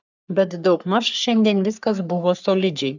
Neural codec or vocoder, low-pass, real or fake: codec, 44.1 kHz, 3.4 kbps, Pupu-Codec; 7.2 kHz; fake